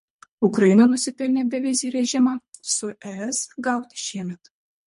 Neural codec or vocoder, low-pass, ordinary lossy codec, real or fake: codec, 24 kHz, 3 kbps, HILCodec; 10.8 kHz; MP3, 48 kbps; fake